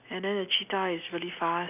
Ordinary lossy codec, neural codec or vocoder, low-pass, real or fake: none; none; 3.6 kHz; real